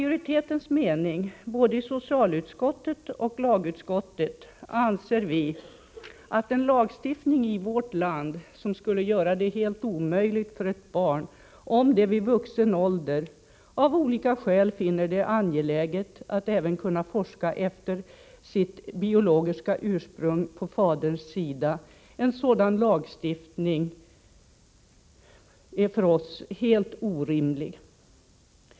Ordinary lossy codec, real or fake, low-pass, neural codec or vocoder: none; real; none; none